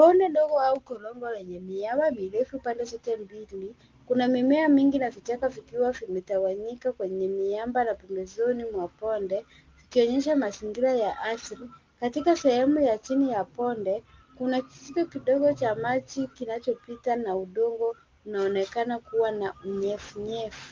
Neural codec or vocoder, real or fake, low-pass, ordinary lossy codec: none; real; 7.2 kHz; Opus, 32 kbps